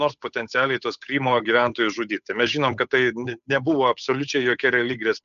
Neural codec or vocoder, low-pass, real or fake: codec, 16 kHz, 8 kbps, FunCodec, trained on Chinese and English, 25 frames a second; 7.2 kHz; fake